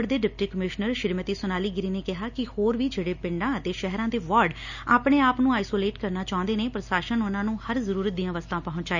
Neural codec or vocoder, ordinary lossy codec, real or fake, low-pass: none; none; real; 7.2 kHz